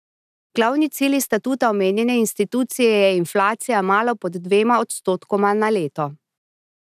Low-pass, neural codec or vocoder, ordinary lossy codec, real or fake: 14.4 kHz; none; none; real